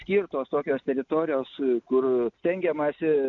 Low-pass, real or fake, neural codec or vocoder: 7.2 kHz; real; none